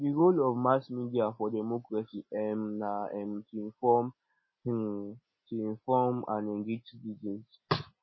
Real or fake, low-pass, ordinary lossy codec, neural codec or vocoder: real; 7.2 kHz; MP3, 24 kbps; none